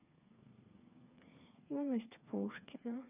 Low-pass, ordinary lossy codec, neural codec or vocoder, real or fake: 3.6 kHz; none; codec, 16 kHz, 4 kbps, FreqCodec, smaller model; fake